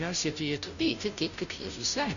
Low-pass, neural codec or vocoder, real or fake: 7.2 kHz; codec, 16 kHz, 0.5 kbps, FunCodec, trained on Chinese and English, 25 frames a second; fake